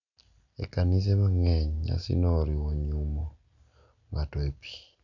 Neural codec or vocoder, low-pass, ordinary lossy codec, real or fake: none; 7.2 kHz; none; real